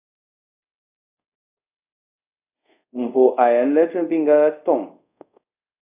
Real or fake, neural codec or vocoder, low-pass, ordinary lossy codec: fake; codec, 24 kHz, 0.5 kbps, DualCodec; 3.6 kHz; AAC, 32 kbps